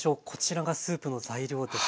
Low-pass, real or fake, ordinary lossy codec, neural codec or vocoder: none; real; none; none